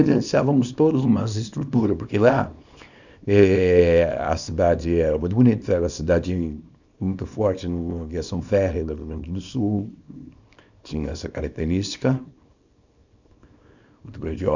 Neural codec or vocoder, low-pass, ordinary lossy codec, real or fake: codec, 24 kHz, 0.9 kbps, WavTokenizer, small release; 7.2 kHz; none; fake